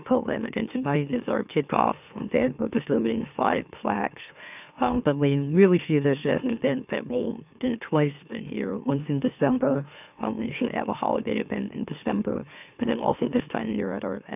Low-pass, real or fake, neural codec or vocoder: 3.6 kHz; fake; autoencoder, 44.1 kHz, a latent of 192 numbers a frame, MeloTTS